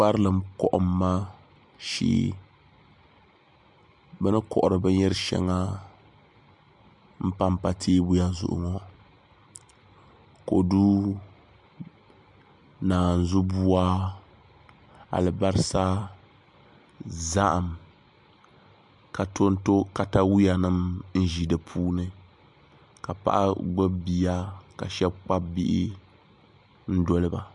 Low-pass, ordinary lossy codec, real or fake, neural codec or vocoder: 10.8 kHz; MP3, 64 kbps; real; none